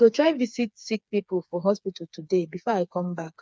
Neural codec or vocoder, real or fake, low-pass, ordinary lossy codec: codec, 16 kHz, 4 kbps, FreqCodec, smaller model; fake; none; none